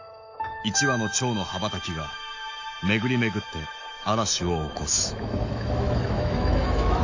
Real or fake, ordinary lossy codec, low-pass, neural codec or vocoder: fake; AAC, 48 kbps; 7.2 kHz; autoencoder, 48 kHz, 128 numbers a frame, DAC-VAE, trained on Japanese speech